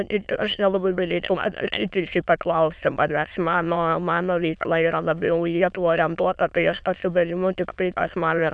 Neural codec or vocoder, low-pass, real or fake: autoencoder, 22.05 kHz, a latent of 192 numbers a frame, VITS, trained on many speakers; 9.9 kHz; fake